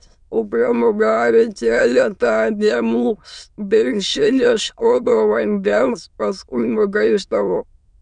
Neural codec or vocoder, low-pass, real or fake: autoencoder, 22.05 kHz, a latent of 192 numbers a frame, VITS, trained on many speakers; 9.9 kHz; fake